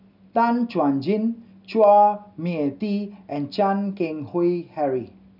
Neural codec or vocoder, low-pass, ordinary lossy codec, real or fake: none; 5.4 kHz; none; real